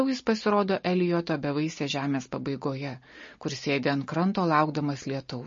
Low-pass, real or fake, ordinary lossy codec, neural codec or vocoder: 7.2 kHz; real; MP3, 32 kbps; none